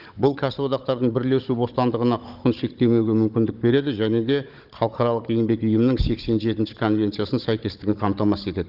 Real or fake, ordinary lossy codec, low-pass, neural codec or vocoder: fake; Opus, 24 kbps; 5.4 kHz; codec, 16 kHz, 16 kbps, FunCodec, trained on Chinese and English, 50 frames a second